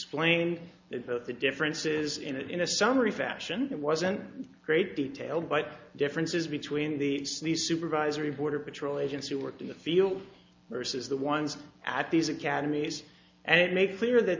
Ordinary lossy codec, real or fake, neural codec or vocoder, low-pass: MP3, 64 kbps; real; none; 7.2 kHz